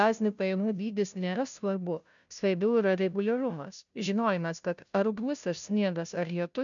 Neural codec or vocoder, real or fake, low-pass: codec, 16 kHz, 0.5 kbps, FunCodec, trained on Chinese and English, 25 frames a second; fake; 7.2 kHz